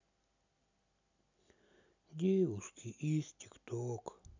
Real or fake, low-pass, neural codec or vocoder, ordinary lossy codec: real; 7.2 kHz; none; none